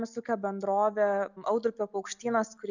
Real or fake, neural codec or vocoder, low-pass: real; none; 7.2 kHz